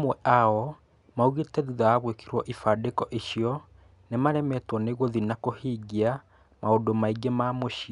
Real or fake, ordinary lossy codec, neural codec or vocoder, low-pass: real; none; none; 10.8 kHz